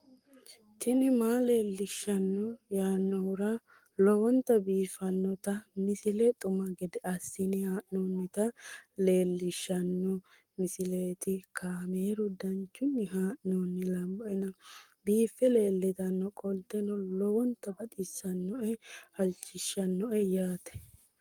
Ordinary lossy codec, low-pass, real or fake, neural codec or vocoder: Opus, 32 kbps; 19.8 kHz; fake; codec, 44.1 kHz, 7.8 kbps, Pupu-Codec